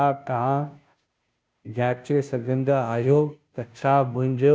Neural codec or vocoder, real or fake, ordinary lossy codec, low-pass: codec, 16 kHz, 0.5 kbps, FunCodec, trained on Chinese and English, 25 frames a second; fake; none; none